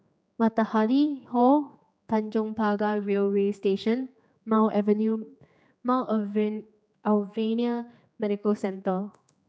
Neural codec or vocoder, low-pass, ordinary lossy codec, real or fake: codec, 16 kHz, 4 kbps, X-Codec, HuBERT features, trained on general audio; none; none; fake